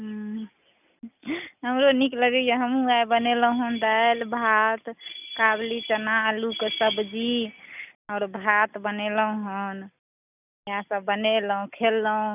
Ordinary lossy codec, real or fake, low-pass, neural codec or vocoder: none; real; 3.6 kHz; none